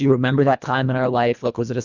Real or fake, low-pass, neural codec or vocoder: fake; 7.2 kHz; codec, 24 kHz, 1.5 kbps, HILCodec